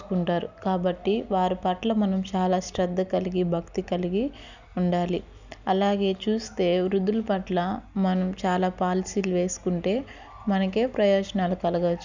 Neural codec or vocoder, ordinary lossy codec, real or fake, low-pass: none; none; real; 7.2 kHz